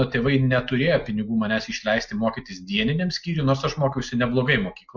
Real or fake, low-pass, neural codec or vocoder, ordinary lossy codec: real; 7.2 kHz; none; MP3, 48 kbps